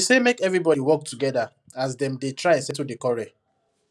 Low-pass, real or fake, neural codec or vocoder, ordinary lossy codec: none; real; none; none